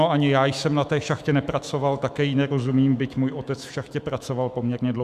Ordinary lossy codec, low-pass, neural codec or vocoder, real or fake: Opus, 64 kbps; 14.4 kHz; autoencoder, 48 kHz, 128 numbers a frame, DAC-VAE, trained on Japanese speech; fake